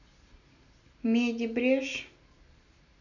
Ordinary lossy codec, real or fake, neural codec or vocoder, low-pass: none; real; none; 7.2 kHz